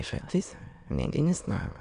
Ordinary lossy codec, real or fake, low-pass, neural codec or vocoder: Opus, 64 kbps; fake; 9.9 kHz; autoencoder, 22.05 kHz, a latent of 192 numbers a frame, VITS, trained on many speakers